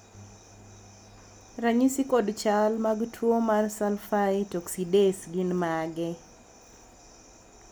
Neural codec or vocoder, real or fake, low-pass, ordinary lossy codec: none; real; none; none